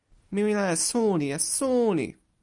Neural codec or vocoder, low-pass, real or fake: none; 10.8 kHz; real